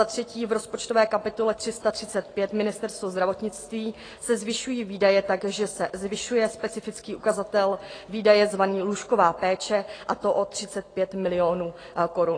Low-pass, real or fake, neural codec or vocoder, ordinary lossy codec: 9.9 kHz; real; none; AAC, 32 kbps